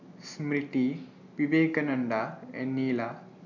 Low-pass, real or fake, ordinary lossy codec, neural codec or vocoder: 7.2 kHz; real; none; none